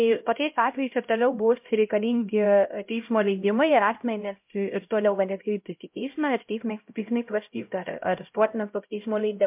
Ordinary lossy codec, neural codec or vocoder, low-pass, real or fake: MP3, 32 kbps; codec, 16 kHz, 0.5 kbps, X-Codec, HuBERT features, trained on LibriSpeech; 3.6 kHz; fake